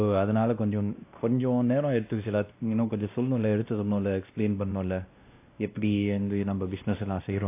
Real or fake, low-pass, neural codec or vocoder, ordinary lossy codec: fake; 3.6 kHz; codec, 16 kHz, 1 kbps, X-Codec, WavLM features, trained on Multilingual LibriSpeech; AAC, 24 kbps